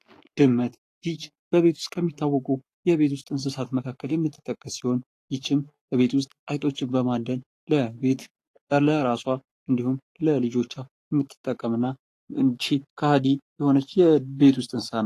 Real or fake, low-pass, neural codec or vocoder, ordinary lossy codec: fake; 14.4 kHz; codec, 44.1 kHz, 7.8 kbps, Pupu-Codec; AAC, 48 kbps